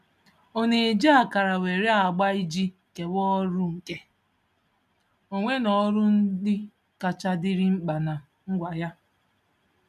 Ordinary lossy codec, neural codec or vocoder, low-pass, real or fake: none; none; 14.4 kHz; real